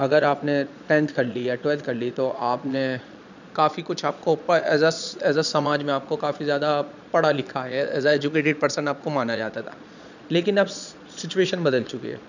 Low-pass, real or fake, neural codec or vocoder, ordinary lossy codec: 7.2 kHz; fake; vocoder, 22.05 kHz, 80 mel bands, Vocos; none